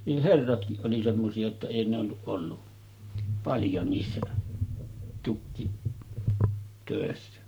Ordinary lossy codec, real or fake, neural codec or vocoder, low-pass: none; fake; codec, 44.1 kHz, 7.8 kbps, Pupu-Codec; none